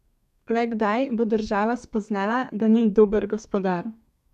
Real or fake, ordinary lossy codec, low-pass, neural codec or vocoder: fake; none; 14.4 kHz; codec, 32 kHz, 1.9 kbps, SNAC